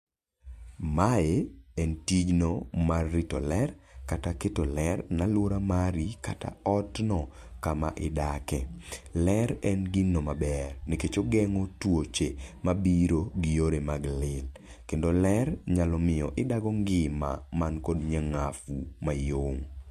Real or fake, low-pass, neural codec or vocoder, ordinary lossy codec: real; 14.4 kHz; none; MP3, 64 kbps